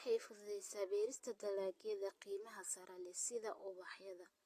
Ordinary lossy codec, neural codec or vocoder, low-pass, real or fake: AAC, 48 kbps; none; 14.4 kHz; real